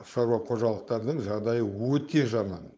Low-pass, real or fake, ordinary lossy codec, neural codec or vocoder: none; fake; none; codec, 16 kHz, 4.8 kbps, FACodec